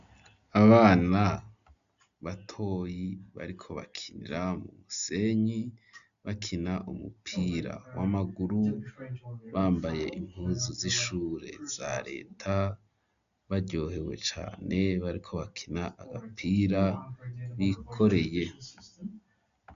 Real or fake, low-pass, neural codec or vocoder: real; 7.2 kHz; none